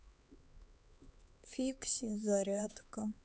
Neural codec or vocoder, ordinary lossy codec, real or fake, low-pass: codec, 16 kHz, 4 kbps, X-Codec, HuBERT features, trained on LibriSpeech; none; fake; none